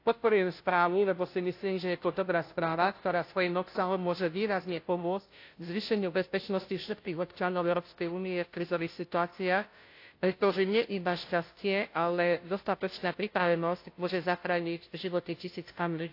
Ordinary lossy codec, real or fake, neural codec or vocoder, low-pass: AAC, 32 kbps; fake; codec, 16 kHz, 0.5 kbps, FunCodec, trained on Chinese and English, 25 frames a second; 5.4 kHz